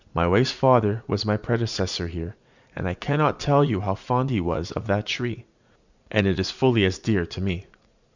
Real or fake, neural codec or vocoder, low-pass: fake; vocoder, 44.1 kHz, 80 mel bands, Vocos; 7.2 kHz